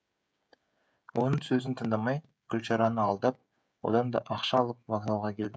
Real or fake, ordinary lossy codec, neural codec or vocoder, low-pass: fake; none; codec, 16 kHz, 16 kbps, FreqCodec, smaller model; none